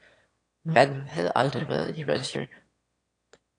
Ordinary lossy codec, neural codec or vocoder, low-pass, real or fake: AAC, 48 kbps; autoencoder, 22.05 kHz, a latent of 192 numbers a frame, VITS, trained on one speaker; 9.9 kHz; fake